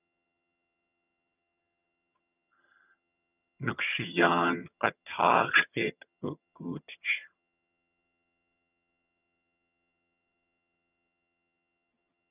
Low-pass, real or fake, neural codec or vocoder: 3.6 kHz; fake; vocoder, 22.05 kHz, 80 mel bands, HiFi-GAN